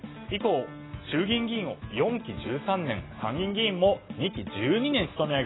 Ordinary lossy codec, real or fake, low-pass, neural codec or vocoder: AAC, 16 kbps; real; 7.2 kHz; none